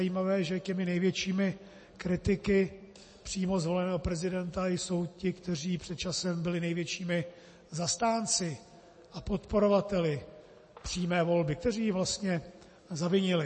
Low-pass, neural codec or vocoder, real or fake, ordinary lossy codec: 9.9 kHz; none; real; MP3, 32 kbps